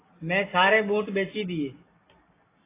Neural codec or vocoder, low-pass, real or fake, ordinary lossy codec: none; 3.6 kHz; real; AAC, 16 kbps